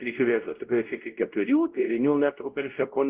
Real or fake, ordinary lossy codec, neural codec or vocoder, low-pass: fake; Opus, 16 kbps; codec, 16 kHz, 0.5 kbps, X-Codec, WavLM features, trained on Multilingual LibriSpeech; 3.6 kHz